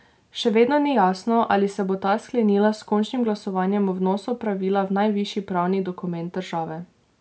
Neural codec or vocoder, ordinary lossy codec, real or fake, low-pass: none; none; real; none